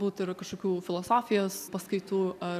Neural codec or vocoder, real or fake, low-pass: none; real; 14.4 kHz